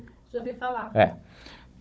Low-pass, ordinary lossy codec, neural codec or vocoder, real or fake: none; none; codec, 16 kHz, 16 kbps, FunCodec, trained on Chinese and English, 50 frames a second; fake